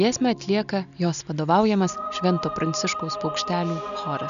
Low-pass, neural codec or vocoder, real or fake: 7.2 kHz; none; real